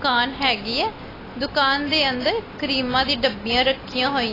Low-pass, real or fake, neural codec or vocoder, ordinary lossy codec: 5.4 kHz; real; none; AAC, 24 kbps